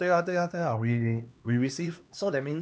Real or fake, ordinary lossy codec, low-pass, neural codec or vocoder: fake; none; none; codec, 16 kHz, 2 kbps, X-Codec, HuBERT features, trained on LibriSpeech